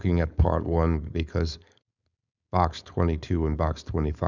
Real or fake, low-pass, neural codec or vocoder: fake; 7.2 kHz; codec, 16 kHz, 4.8 kbps, FACodec